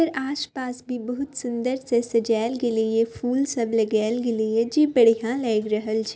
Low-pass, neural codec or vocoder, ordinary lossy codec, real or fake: none; none; none; real